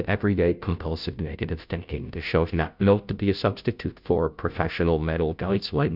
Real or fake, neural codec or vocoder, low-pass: fake; codec, 16 kHz, 0.5 kbps, FunCodec, trained on Chinese and English, 25 frames a second; 5.4 kHz